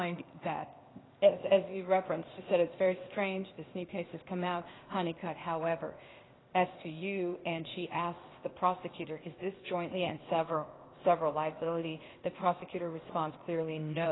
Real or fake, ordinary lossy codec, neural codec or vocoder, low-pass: fake; AAC, 16 kbps; codec, 16 kHz, 0.8 kbps, ZipCodec; 7.2 kHz